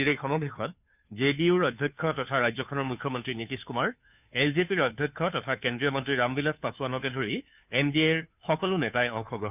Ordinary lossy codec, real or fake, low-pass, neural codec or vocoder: none; fake; 3.6 kHz; codec, 16 kHz, 2 kbps, FunCodec, trained on Chinese and English, 25 frames a second